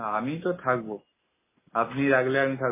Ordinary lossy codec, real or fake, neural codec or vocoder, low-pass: MP3, 16 kbps; real; none; 3.6 kHz